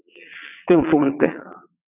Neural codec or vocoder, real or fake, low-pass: vocoder, 22.05 kHz, 80 mel bands, WaveNeXt; fake; 3.6 kHz